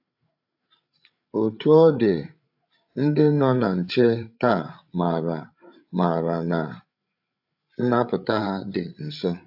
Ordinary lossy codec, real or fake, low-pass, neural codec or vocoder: AAC, 48 kbps; fake; 5.4 kHz; codec, 16 kHz, 8 kbps, FreqCodec, larger model